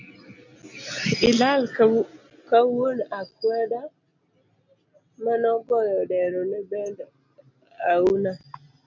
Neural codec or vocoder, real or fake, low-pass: none; real; 7.2 kHz